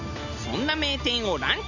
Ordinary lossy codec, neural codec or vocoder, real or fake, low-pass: none; none; real; 7.2 kHz